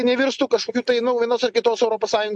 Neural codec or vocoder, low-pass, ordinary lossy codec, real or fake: none; 10.8 kHz; MP3, 64 kbps; real